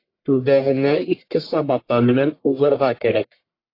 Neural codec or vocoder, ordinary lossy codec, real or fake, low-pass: codec, 44.1 kHz, 1.7 kbps, Pupu-Codec; AAC, 32 kbps; fake; 5.4 kHz